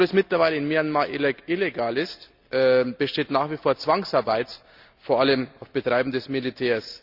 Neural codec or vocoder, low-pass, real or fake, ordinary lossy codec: none; 5.4 kHz; real; Opus, 64 kbps